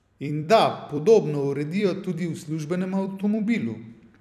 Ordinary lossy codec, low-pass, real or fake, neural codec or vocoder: none; 14.4 kHz; fake; vocoder, 44.1 kHz, 128 mel bands every 256 samples, BigVGAN v2